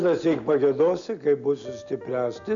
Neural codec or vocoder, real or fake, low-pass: none; real; 7.2 kHz